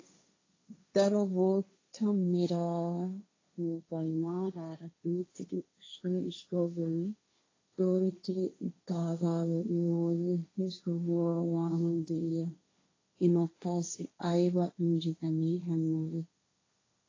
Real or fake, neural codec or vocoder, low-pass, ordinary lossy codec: fake; codec, 16 kHz, 1.1 kbps, Voila-Tokenizer; 7.2 kHz; AAC, 32 kbps